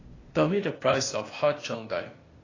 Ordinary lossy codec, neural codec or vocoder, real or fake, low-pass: AAC, 32 kbps; codec, 16 kHz, 0.8 kbps, ZipCodec; fake; 7.2 kHz